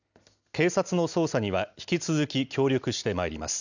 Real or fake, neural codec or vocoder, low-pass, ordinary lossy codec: real; none; 7.2 kHz; none